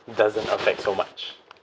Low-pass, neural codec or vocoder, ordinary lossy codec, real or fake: none; none; none; real